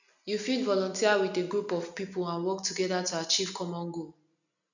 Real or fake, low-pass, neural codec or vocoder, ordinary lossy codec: real; 7.2 kHz; none; none